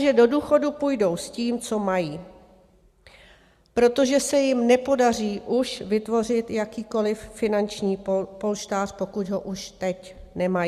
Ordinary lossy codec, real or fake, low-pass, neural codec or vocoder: Opus, 64 kbps; real; 14.4 kHz; none